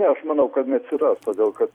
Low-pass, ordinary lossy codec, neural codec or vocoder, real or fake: 19.8 kHz; MP3, 96 kbps; vocoder, 44.1 kHz, 128 mel bands every 256 samples, BigVGAN v2; fake